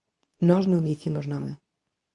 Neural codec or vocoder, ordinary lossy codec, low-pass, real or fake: codec, 24 kHz, 0.9 kbps, WavTokenizer, medium speech release version 2; AAC, 64 kbps; 10.8 kHz; fake